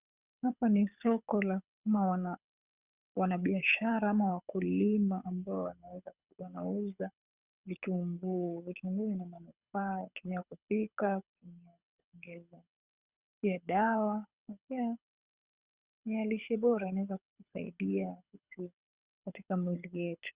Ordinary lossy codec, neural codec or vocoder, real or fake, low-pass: Opus, 64 kbps; codec, 24 kHz, 6 kbps, HILCodec; fake; 3.6 kHz